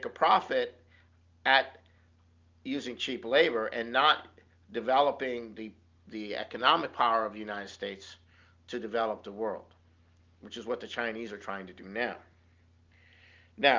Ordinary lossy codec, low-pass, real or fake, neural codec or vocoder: Opus, 32 kbps; 7.2 kHz; real; none